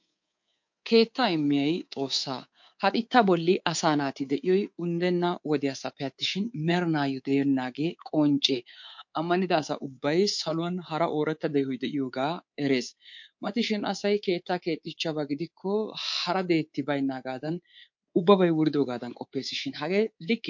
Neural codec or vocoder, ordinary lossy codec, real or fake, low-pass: codec, 24 kHz, 3.1 kbps, DualCodec; MP3, 48 kbps; fake; 7.2 kHz